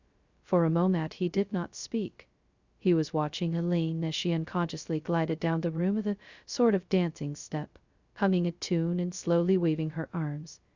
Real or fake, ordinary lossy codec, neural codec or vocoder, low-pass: fake; Opus, 64 kbps; codec, 16 kHz, 0.2 kbps, FocalCodec; 7.2 kHz